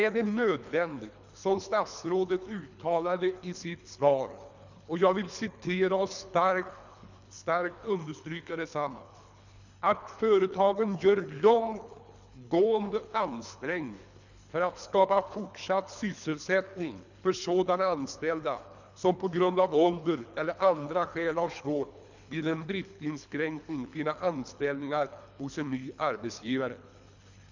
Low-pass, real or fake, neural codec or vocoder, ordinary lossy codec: 7.2 kHz; fake; codec, 24 kHz, 3 kbps, HILCodec; none